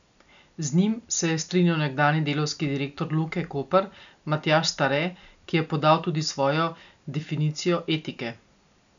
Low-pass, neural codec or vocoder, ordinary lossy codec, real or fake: 7.2 kHz; none; none; real